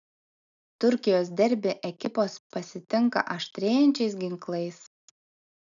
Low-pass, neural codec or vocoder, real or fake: 7.2 kHz; none; real